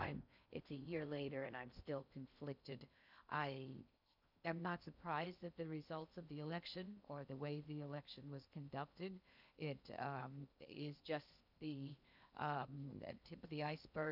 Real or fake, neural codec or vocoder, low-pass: fake; codec, 16 kHz in and 24 kHz out, 0.6 kbps, FocalCodec, streaming, 4096 codes; 5.4 kHz